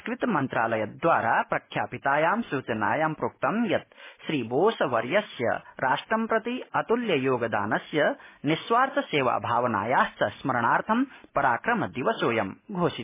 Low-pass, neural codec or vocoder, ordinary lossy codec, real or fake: 3.6 kHz; none; MP3, 16 kbps; real